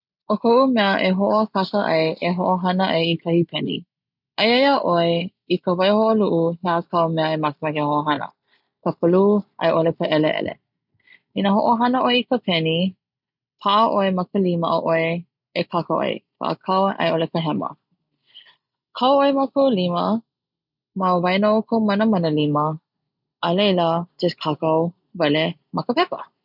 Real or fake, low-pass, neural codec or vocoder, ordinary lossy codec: real; 5.4 kHz; none; none